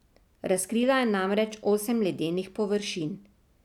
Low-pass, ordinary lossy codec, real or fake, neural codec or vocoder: 19.8 kHz; none; real; none